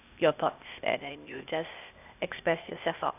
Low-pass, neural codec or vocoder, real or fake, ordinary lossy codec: 3.6 kHz; codec, 16 kHz, 0.8 kbps, ZipCodec; fake; none